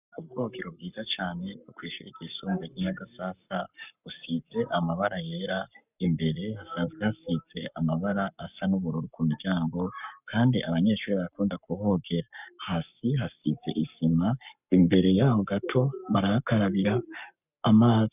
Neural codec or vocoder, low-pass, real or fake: codec, 44.1 kHz, 7.8 kbps, Pupu-Codec; 3.6 kHz; fake